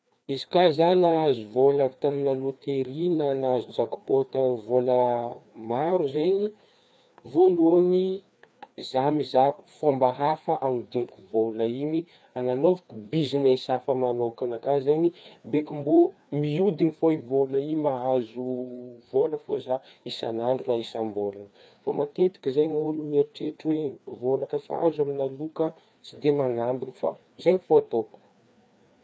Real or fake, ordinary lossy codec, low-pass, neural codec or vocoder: fake; none; none; codec, 16 kHz, 2 kbps, FreqCodec, larger model